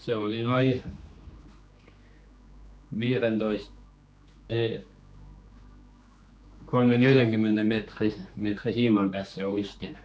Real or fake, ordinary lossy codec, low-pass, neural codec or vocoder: fake; none; none; codec, 16 kHz, 2 kbps, X-Codec, HuBERT features, trained on general audio